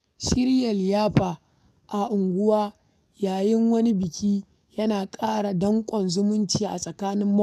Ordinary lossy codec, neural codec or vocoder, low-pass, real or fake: none; codec, 44.1 kHz, 7.8 kbps, DAC; 14.4 kHz; fake